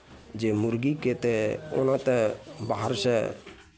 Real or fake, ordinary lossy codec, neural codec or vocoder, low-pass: real; none; none; none